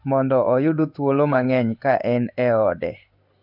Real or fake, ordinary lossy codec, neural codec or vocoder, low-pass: fake; none; codec, 16 kHz in and 24 kHz out, 1 kbps, XY-Tokenizer; 5.4 kHz